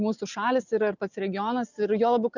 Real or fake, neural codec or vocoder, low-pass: real; none; 7.2 kHz